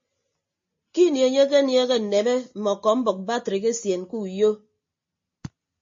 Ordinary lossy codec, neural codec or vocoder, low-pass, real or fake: MP3, 32 kbps; none; 7.2 kHz; real